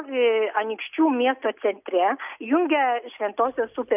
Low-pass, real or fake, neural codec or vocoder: 3.6 kHz; real; none